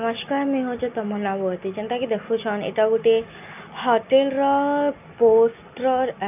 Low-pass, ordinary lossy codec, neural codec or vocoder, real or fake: 3.6 kHz; none; none; real